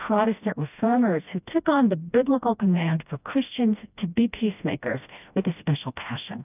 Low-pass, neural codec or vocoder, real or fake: 3.6 kHz; codec, 16 kHz, 1 kbps, FreqCodec, smaller model; fake